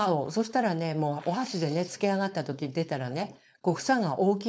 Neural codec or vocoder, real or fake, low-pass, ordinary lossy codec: codec, 16 kHz, 4.8 kbps, FACodec; fake; none; none